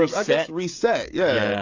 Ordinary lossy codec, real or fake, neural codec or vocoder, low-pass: AAC, 48 kbps; fake; codec, 16 kHz, 16 kbps, FreqCodec, smaller model; 7.2 kHz